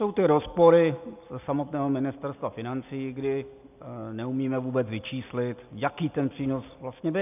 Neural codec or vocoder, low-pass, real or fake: none; 3.6 kHz; real